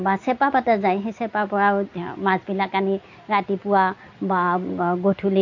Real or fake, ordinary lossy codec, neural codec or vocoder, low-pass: real; AAC, 48 kbps; none; 7.2 kHz